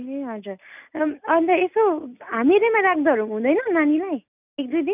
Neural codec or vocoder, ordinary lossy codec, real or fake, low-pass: none; none; real; 3.6 kHz